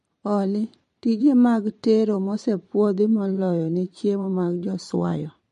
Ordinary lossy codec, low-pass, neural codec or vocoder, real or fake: MP3, 48 kbps; 14.4 kHz; vocoder, 44.1 kHz, 128 mel bands every 512 samples, BigVGAN v2; fake